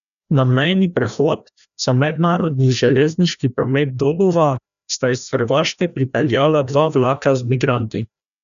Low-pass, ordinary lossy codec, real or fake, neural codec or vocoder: 7.2 kHz; none; fake; codec, 16 kHz, 1 kbps, FreqCodec, larger model